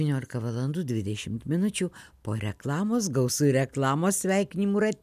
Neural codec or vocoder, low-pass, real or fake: none; 14.4 kHz; real